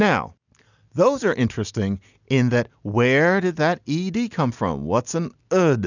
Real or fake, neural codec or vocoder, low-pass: real; none; 7.2 kHz